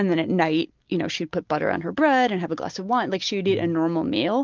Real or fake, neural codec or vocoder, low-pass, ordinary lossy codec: real; none; 7.2 kHz; Opus, 32 kbps